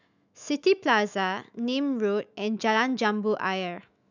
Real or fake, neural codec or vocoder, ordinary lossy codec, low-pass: fake; autoencoder, 48 kHz, 128 numbers a frame, DAC-VAE, trained on Japanese speech; none; 7.2 kHz